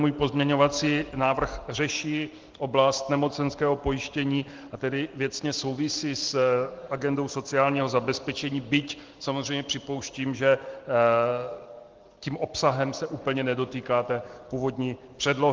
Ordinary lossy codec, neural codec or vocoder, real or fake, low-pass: Opus, 16 kbps; none; real; 7.2 kHz